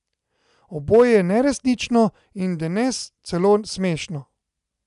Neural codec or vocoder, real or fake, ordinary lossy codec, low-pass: none; real; none; 10.8 kHz